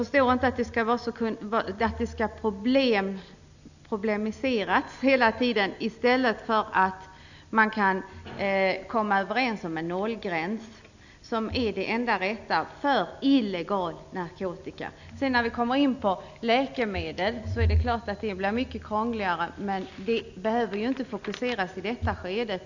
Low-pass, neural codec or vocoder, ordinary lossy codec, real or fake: 7.2 kHz; none; none; real